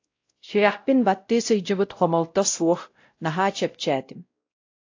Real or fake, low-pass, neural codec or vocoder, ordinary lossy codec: fake; 7.2 kHz; codec, 16 kHz, 0.5 kbps, X-Codec, WavLM features, trained on Multilingual LibriSpeech; AAC, 48 kbps